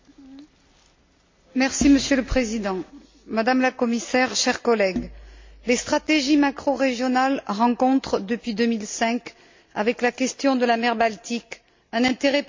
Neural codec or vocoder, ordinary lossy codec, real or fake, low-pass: none; MP3, 48 kbps; real; 7.2 kHz